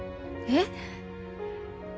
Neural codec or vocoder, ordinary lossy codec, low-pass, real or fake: none; none; none; real